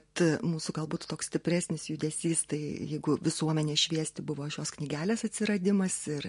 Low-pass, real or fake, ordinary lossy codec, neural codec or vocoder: 10.8 kHz; real; MP3, 48 kbps; none